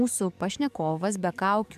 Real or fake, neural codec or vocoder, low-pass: fake; codec, 44.1 kHz, 7.8 kbps, DAC; 14.4 kHz